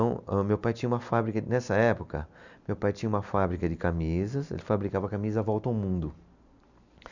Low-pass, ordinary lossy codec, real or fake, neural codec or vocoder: 7.2 kHz; none; real; none